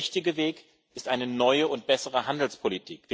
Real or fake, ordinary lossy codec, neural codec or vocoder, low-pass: real; none; none; none